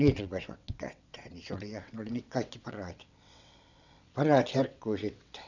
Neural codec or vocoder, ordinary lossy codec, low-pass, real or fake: vocoder, 22.05 kHz, 80 mel bands, WaveNeXt; none; 7.2 kHz; fake